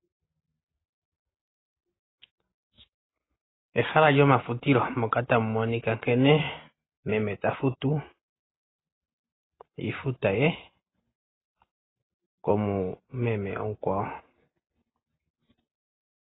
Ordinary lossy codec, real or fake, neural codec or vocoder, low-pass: AAC, 16 kbps; real; none; 7.2 kHz